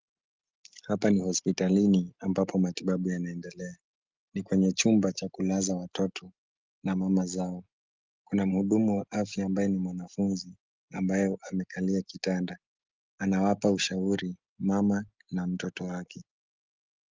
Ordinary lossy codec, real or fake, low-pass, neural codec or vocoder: Opus, 32 kbps; real; 7.2 kHz; none